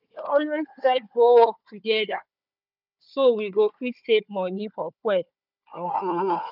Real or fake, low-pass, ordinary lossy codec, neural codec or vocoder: fake; 5.4 kHz; none; codec, 16 kHz, 4 kbps, FunCodec, trained on Chinese and English, 50 frames a second